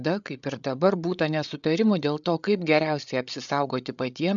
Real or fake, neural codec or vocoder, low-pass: fake; codec, 16 kHz, 8 kbps, FreqCodec, larger model; 7.2 kHz